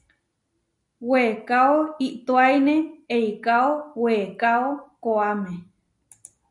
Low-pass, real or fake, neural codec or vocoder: 10.8 kHz; real; none